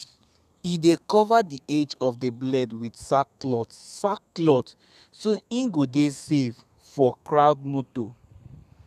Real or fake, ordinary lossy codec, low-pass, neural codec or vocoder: fake; none; 14.4 kHz; codec, 32 kHz, 1.9 kbps, SNAC